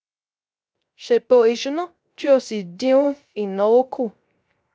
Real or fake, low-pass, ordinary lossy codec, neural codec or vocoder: fake; none; none; codec, 16 kHz, 0.3 kbps, FocalCodec